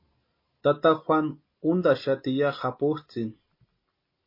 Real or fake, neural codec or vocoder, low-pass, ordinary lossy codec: real; none; 5.4 kHz; MP3, 24 kbps